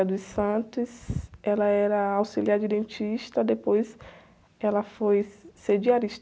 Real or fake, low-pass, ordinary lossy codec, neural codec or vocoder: real; none; none; none